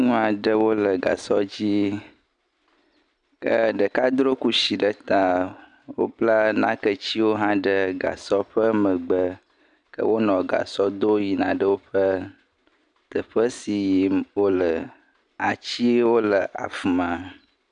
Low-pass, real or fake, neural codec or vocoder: 9.9 kHz; real; none